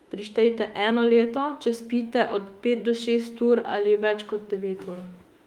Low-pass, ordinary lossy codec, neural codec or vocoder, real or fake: 19.8 kHz; Opus, 32 kbps; autoencoder, 48 kHz, 32 numbers a frame, DAC-VAE, trained on Japanese speech; fake